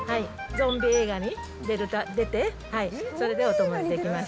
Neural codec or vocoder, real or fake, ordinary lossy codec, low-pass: none; real; none; none